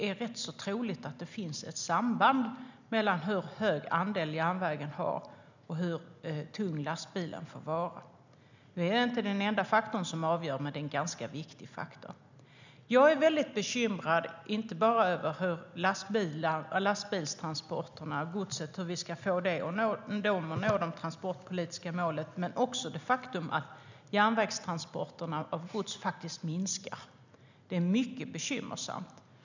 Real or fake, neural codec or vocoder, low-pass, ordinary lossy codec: real; none; 7.2 kHz; none